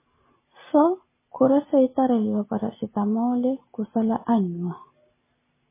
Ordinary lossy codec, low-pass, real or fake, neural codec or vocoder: MP3, 16 kbps; 3.6 kHz; real; none